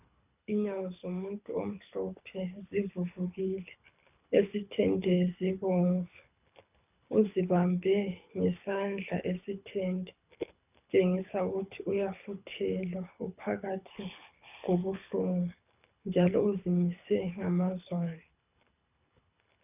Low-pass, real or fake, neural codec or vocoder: 3.6 kHz; fake; codec, 24 kHz, 6 kbps, HILCodec